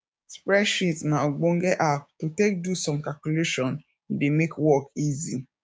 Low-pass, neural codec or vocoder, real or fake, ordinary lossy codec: none; codec, 16 kHz, 6 kbps, DAC; fake; none